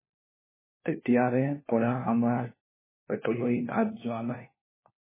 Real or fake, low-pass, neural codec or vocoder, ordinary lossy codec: fake; 3.6 kHz; codec, 16 kHz, 1 kbps, FunCodec, trained on LibriTTS, 50 frames a second; MP3, 16 kbps